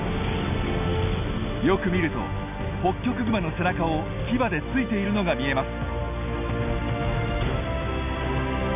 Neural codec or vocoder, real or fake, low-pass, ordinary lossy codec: none; real; 3.6 kHz; none